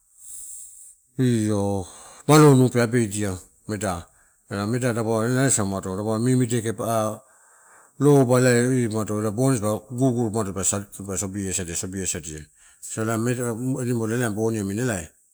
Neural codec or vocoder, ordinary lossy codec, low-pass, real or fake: none; none; none; real